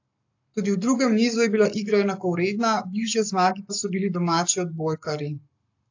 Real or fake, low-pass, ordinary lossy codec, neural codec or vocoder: fake; 7.2 kHz; AAC, 48 kbps; codec, 44.1 kHz, 7.8 kbps, Pupu-Codec